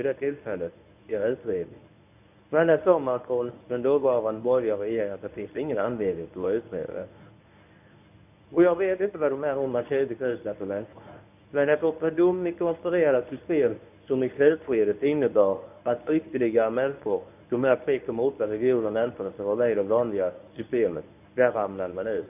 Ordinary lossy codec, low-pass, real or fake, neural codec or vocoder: none; 3.6 kHz; fake; codec, 24 kHz, 0.9 kbps, WavTokenizer, medium speech release version 1